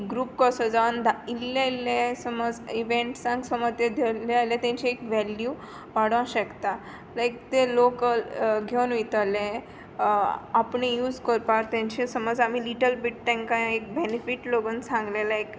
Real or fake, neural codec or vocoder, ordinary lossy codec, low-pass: real; none; none; none